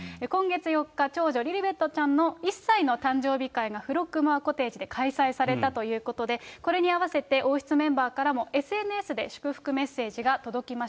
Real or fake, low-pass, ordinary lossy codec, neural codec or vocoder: real; none; none; none